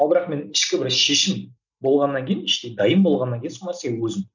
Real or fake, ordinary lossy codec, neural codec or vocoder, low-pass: real; none; none; 7.2 kHz